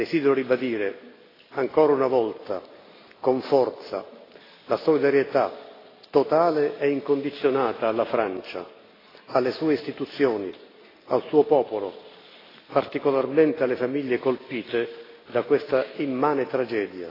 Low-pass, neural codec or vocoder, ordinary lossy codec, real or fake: 5.4 kHz; none; AAC, 24 kbps; real